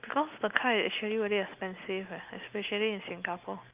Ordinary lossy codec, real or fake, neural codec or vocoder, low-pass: Opus, 24 kbps; real; none; 3.6 kHz